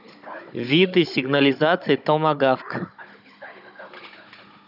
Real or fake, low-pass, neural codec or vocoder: fake; 5.4 kHz; codec, 16 kHz, 16 kbps, FunCodec, trained on Chinese and English, 50 frames a second